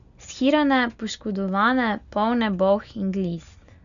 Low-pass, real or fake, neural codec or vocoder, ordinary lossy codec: 7.2 kHz; real; none; none